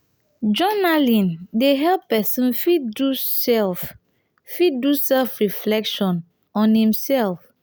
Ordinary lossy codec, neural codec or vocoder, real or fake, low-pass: none; none; real; none